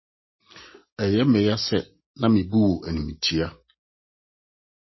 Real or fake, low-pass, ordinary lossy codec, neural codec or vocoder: real; 7.2 kHz; MP3, 24 kbps; none